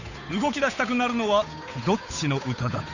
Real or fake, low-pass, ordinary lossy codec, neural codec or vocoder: fake; 7.2 kHz; none; codec, 16 kHz, 8 kbps, FunCodec, trained on Chinese and English, 25 frames a second